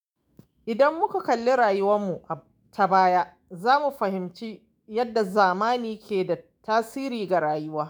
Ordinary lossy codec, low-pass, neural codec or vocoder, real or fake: none; none; autoencoder, 48 kHz, 128 numbers a frame, DAC-VAE, trained on Japanese speech; fake